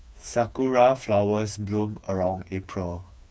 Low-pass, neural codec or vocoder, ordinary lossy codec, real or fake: none; codec, 16 kHz, 4 kbps, FreqCodec, smaller model; none; fake